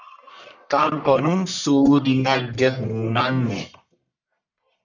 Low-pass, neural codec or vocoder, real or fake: 7.2 kHz; codec, 44.1 kHz, 1.7 kbps, Pupu-Codec; fake